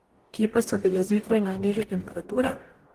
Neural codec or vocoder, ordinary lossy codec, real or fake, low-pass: codec, 44.1 kHz, 0.9 kbps, DAC; Opus, 24 kbps; fake; 14.4 kHz